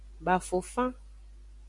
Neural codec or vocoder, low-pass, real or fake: none; 10.8 kHz; real